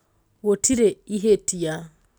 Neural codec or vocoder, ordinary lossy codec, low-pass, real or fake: none; none; none; real